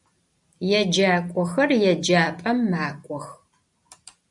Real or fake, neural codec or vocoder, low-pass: real; none; 10.8 kHz